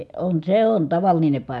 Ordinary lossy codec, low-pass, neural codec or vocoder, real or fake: none; none; none; real